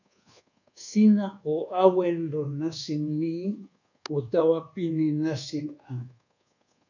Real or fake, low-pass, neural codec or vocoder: fake; 7.2 kHz; codec, 24 kHz, 1.2 kbps, DualCodec